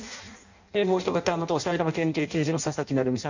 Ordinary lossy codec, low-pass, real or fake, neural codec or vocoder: none; 7.2 kHz; fake; codec, 16 kHz in and 24 kHz out, 0.6 kbps, FireRedTTS-2 codec